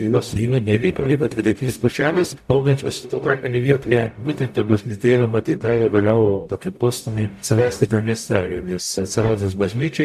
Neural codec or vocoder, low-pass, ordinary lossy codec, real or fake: codec, 44.1 kHz, 0.9 kbps, DAC; 14.4 kHz; AAC, 96 kbps; fake